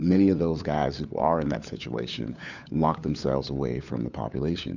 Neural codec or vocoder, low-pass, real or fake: codec, 16 kHz, 4 kbps, FunCodec, trained on LibriTTS, 50 frames a second; 7.2 kHz; fake